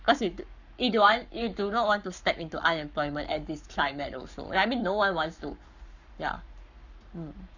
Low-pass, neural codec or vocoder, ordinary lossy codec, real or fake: 7.2 kHz; codec, 44.1 kHz, 7.8 kbps, Pupu-Codec; none; fake